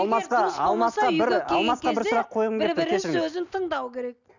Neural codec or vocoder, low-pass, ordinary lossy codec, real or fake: none; 7.2 kHz; none; real